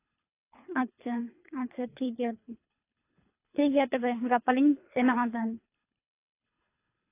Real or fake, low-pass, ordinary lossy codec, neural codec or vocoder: fake; 3.6 kHz; AAC, 24 kbps; codec, 24 kHz, 3 kbps, HILCodec